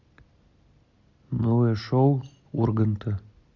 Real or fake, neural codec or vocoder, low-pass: real; none; 7.2 kHz